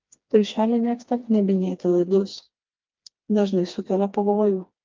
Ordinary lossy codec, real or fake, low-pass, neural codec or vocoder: Opus, 32 kbps; fake; 7.2 kHz; codec, 16 kHz, 2 kbps, FreqCodec, smaller model